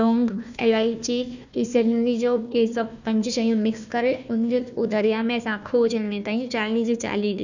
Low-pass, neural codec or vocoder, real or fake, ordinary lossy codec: 7.2 kHz; codec, 16 kHz, 1 kbps, FunCodec, trained on Chinese and English, 50 frames a second; fake; none